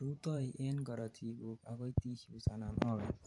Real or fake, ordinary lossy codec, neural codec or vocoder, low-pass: fake; AAC, 64 kbps; vocoder, 44.1 kHz, 128 mel bands every 512 samples, BigVGAN v2; 10.8 kHz